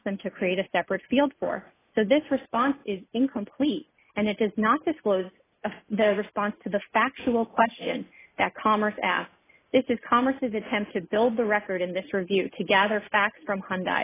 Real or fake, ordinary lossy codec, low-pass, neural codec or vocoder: real; AAC, 16 kbps; 3.6 kHz; none